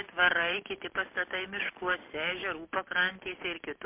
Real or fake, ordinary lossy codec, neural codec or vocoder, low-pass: real; MP3, 32 kbps; none; 3.6 kHz